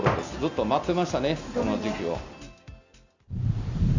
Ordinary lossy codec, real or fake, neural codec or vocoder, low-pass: Opus, 64 kbps; real; none; 7.2 kHz